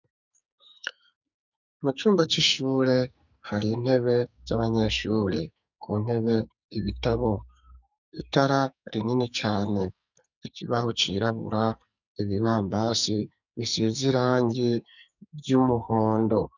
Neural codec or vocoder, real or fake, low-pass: codec, 44.1 kHz, 2.6 kbps, SNAC; fake; 7.2 kHz